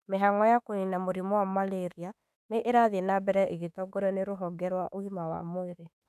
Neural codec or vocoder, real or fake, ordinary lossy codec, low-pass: autoencoder, 48 kHz, 32 numbers a frame, DAC-VAE, trained on Japanese speech; fake; none; 14.4 kHz